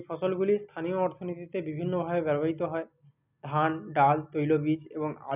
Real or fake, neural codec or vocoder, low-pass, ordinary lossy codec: real; none; 3.6 kHz; none